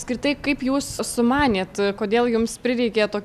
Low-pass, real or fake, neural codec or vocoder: 14.4 kHz; real; none